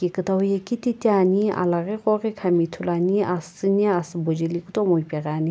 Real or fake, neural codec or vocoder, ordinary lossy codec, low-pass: real; none; none; none